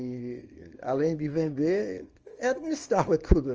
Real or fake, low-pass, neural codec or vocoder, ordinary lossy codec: fake; 7.2 kHz; codec, 24 kHz, 0.9 kbps, WavTokenizer, small release; Opus, 24 kbps